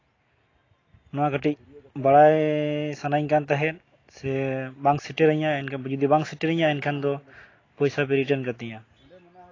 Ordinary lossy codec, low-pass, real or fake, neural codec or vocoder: AAC, 32 kbps; 7.2 kHz; real; none